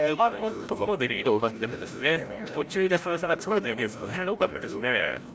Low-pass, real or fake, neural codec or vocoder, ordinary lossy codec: none; fake; codec, 16 kHz, 0.5 kbps, FreqCodec, larger model; none